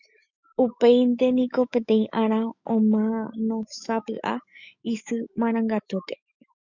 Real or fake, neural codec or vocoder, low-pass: fake; autoencoder, 48 kHz, 128 numbers a frame, DAC-VAE, trained on Japanese speech; 7.2 kHz